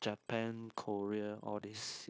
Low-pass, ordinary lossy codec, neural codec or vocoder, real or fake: none; none; codec, 16 kHz, 0.9 kbps, LongCat-Audio-Codec; fake